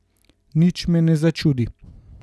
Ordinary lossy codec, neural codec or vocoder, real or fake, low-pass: none; none; real; none